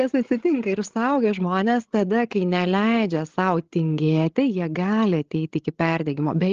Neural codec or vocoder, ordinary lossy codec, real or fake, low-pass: codec, 16 kHz, 16 kbps, FreqCodec, larger model; Opus, 16 kbps; fake; 7.2 kHz